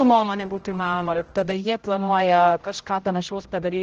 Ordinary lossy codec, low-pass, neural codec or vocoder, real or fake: Opus, 16 kbps; 7.2 kHz; codec, 16 kHz, 0.5 kbps, X-Codec, HuBERT features, trained on general audio; fake